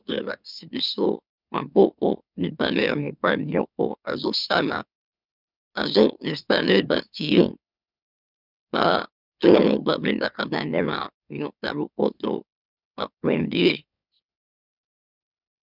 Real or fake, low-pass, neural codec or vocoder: fake; 5.4 kHz; autoencoder, 44.1 kHz, a latent of 192 numbers a frame, MeloTTS